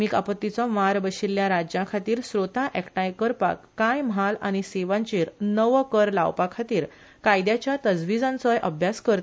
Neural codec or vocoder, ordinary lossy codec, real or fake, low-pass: none; none; real; none